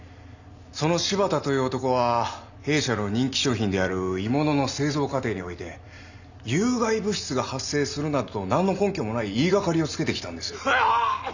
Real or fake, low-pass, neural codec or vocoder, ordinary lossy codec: real; 7.2 kHz; none; none